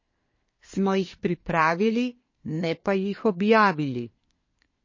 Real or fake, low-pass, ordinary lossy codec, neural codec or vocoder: fake; 7.2 kHz; MP3, 32 kbps; codec, 24 kHz, 1 kbps, SNAC